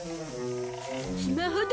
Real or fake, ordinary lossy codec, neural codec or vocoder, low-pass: real; none; none; none